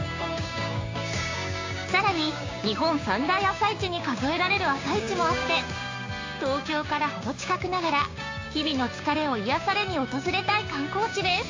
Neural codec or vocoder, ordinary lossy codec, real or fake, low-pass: codec, 16 kHz, 6 kbps, DAC; AAC, 48 kbps; fake; 7.2 kHz